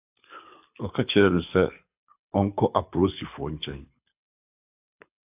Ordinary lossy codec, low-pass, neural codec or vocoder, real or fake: AAC, 32 kbps; 3.6 kHz; codec, 24 kHz, 6 kbps, HILCodec; fake